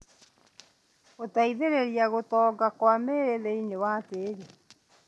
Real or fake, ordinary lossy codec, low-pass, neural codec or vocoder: real; none; none; none